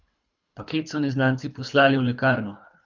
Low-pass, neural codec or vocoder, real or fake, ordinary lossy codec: 7.2 kHz; codec, 24 kHz, 3 kbps, HILCodec; fake; none